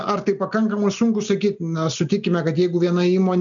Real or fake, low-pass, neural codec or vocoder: real; 7.2 kHz; none